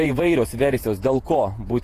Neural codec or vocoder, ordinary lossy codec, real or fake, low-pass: vocoder, 48 kHz, 128 mel bands, Vocos; AAC, 48 kbps; fake; 14.4 kHz